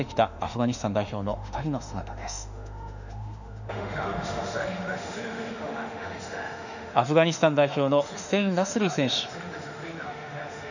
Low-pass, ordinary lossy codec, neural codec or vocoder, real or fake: 7.2 kHz; none; autoencoder, 48 kHz, 32 numbers a frame, DAC-VAE, trained on Japanese speech; fake